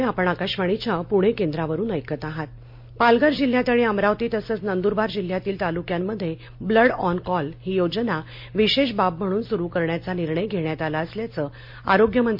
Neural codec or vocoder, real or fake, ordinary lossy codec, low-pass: none; real; MP3, 32 kbps; 5.4 kHz